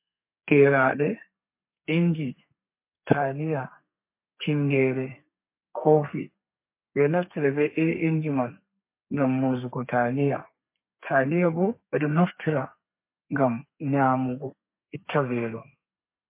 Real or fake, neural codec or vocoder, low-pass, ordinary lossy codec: fake; codec, 32 kHz, 1.9 kbps, SNAC; 3.6 kHz; MP3, 24 kbps